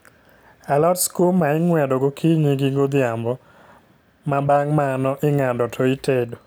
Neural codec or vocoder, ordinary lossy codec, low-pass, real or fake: none; none; none; real